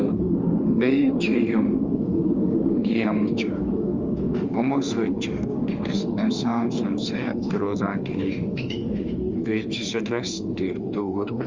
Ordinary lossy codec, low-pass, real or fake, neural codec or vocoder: Opus, 32 kbps; 7.2 kHz; fake; autoencoder, 48 kHz, 32 numbers a frame, DAC-VAE, trained on Japanese speech